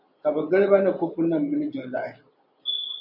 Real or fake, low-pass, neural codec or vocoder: real; 5.4 kHz; none